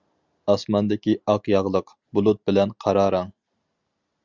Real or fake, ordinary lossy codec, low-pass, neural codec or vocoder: real; Opus, 64 kbps; 7.2 kHz; none